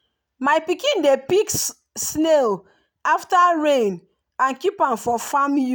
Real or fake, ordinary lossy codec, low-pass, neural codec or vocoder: real; none; none; none